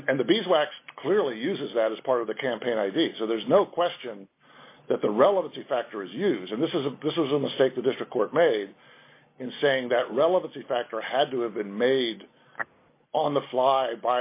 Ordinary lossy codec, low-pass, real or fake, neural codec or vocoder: MP3, 24 kbps; 3.6 kHz; real; none